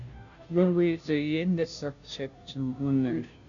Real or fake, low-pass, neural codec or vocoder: fake; 7.2 kHz; codec, 16 kHz, 0.5 kbps, FunCodec, trained on Chinese and English, 25 frames a second